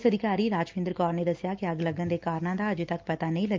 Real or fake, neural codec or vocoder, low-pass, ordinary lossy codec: real; none; 7.2 kHz; Opus, 24 kbps